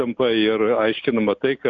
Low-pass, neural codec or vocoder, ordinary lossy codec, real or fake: 7.2 kHz; none; MP3, 64 kbps; real